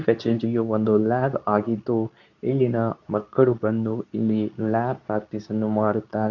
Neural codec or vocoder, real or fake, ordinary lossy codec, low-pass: codec, 24 kHz, 0.9 kbps, WavTokenizer, medium speech release version 2; fake; none; 7.2 kHz